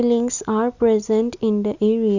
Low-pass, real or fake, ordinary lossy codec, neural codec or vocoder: 7.2 kHz; real; none; none